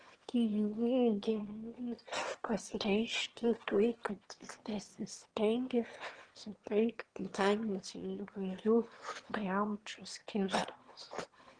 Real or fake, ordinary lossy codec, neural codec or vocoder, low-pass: fake; Opus, 16 kbps; autoencoder, 22.05 kHz, a latent of 192 numbers a frame, VITS, trained on one speaker; 9.9 kHz